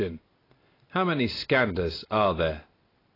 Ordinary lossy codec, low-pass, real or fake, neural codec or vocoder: AAC, 24 kbps; 5.4 kHz; real; none